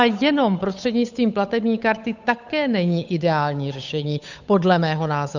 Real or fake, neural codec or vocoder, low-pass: fake; codec, 16 kHz, 8 kbps, FunCodec, trained on Chinese and English, 25 frames a second; 7.2 kHz